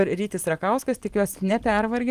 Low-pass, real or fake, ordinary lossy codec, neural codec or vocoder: 14.4 kHz; fake; Opus, 24 kbps; vocoder, 44.1 kHz, 128 mel bands every 256 samples, BigVGAN v2